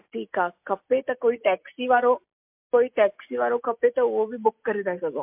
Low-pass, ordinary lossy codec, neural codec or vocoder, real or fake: 3.6 kHz; MP3, 32 kbps; none; real